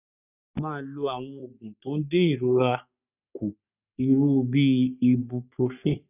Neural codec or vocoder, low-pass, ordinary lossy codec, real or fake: codec, 44.1 kHz, 3.4 kbps, Pupu-Codec; 3.6 kHz; none; fake